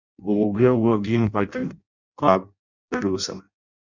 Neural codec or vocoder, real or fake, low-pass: codec, 16 kHz in and 24 kHz out, 0.6 kbps, FireRedTTS-2 codec; fake; 7.2 kHz